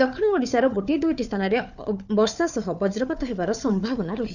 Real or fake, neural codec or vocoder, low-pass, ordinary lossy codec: fake; codec, 16 kHz, 4 kbps, FunCodec, trained on Chinese and English, 50 frames a second; 7.2 kHz; none